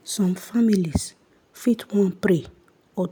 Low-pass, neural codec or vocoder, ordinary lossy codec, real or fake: none; none; none; real